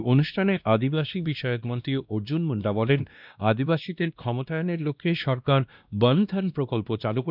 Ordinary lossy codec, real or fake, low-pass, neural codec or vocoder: none; fake; 5.4 kHz; codec, 16 kHz, 1 kbps, X-Codec, WavLM features, trained on Multilingual LibriSpeech